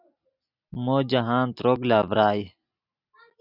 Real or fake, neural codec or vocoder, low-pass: real; none; 5.4 kHz